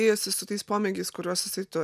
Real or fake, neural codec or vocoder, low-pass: real; none; 14.4 kHz